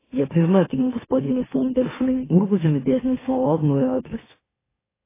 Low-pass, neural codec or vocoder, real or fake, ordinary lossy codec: 3.6 kHz; autoencoder, 44.1 kHz, a latent of 192 numbers a frame, MeloTTS; fake; AAC, 16 kbps